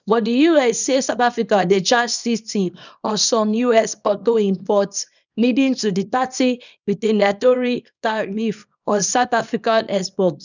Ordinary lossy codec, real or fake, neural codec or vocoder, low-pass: none; fake; codec, 24 kHz, 0.9 kbps, WavTokenizer, small release; 7.2 kHz